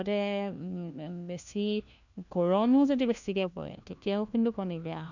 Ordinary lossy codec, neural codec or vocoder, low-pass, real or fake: none; codec, 16 kHz, 1 kbps, FunCodec, trained on LibriTTS, 50 frames a second; 7.2 kHz; fake